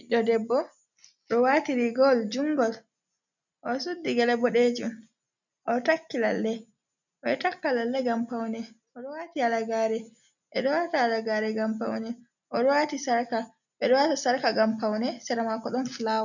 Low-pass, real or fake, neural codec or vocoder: 7.2 kHz; real; none